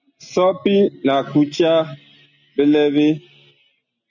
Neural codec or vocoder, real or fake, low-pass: none; real; 7.2 kHz